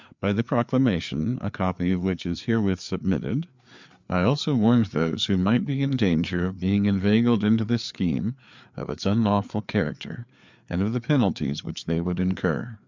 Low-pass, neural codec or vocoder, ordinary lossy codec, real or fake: 7.2 kHz; codec, 16 kHz, 4 kbps, FreqCodec, larger model; MP3, 64 kbps; fake